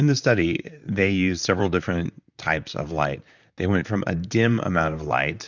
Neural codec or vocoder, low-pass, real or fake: vocoder, 44.1 kHz, 128 mel bands, Pupu-Vocoder; 7.2 kHz; fake